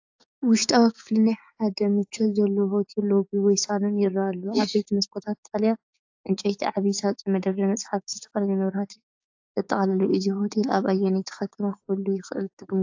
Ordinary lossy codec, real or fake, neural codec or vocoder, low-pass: AAC, 48 kbps; fake; codec, 16 kHz, 6 kbps, DAC; 7.2 kHz